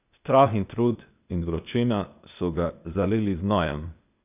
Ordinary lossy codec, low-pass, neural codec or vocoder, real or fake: none; 3.6 kHz; codec, 16 kHz, 0.8 kbps, ZipCodec; fake